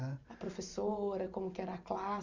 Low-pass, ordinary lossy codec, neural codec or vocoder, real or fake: 7.2 kHz; Opus, 64 kbps; vocoder, 44.1 kHz, 128 mel bands every 512 samples, BigVGAN v2; fake